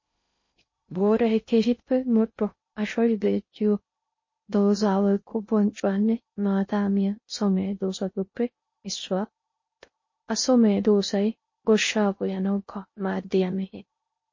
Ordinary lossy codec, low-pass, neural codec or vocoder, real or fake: MP3, 32 kbps; 7.2 kHz; codec, 16 kHz in and 24 kHz out, 0.6 kbps, FocalCodec, streaming, 2048 codes; fake